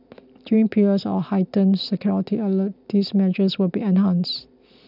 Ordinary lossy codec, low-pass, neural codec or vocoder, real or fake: none; 5.4 kHz; none; real